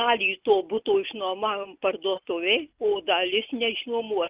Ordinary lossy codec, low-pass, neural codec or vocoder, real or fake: Opus, 32 kbps; 3.6 kHz; none; real